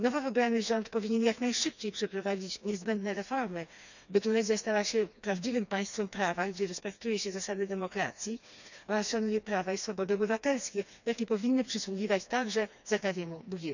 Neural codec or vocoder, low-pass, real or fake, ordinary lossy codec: codec, 16 kHz, 2 kbps, FreqCodec, smaller model; 7.2 kHz; fake; none